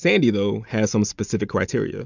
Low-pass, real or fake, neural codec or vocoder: 7.2 kHz; real; none